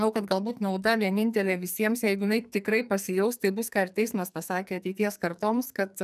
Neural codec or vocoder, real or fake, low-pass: codec, 44.1 kHz, 2.6 kbps, SNAC; fake; 14.4 kHz